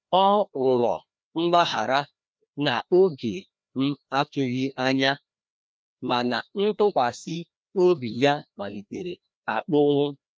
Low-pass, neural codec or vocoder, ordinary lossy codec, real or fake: none; codec, 16 kHz, 1 kbps, FreqCodec, larger model; none; fake